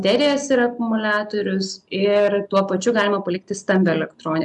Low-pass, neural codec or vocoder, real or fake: 10.8 kHz; none; real